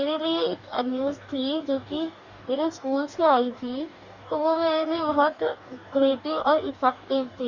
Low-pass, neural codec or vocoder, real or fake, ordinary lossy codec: 7.2 kHz; codec, 44.1 kHz, 2.6 kbps, DAC; fake; none